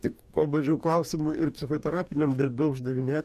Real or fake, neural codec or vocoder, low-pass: fake; codec, 44.1 kHz, 2.6 kbps, DAC; 14.4 kHz